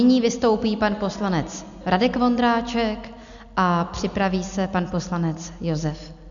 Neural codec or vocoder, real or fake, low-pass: none; real; 7.2 kHz